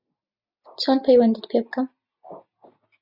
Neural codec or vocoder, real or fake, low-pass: none; real; 5.4 kHz